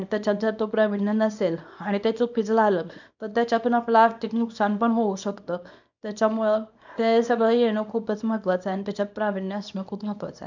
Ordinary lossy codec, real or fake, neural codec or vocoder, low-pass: none; fake; codec, 24 kHz, 0.9 kbps, WavTokenizer, small release; 7.2 kHz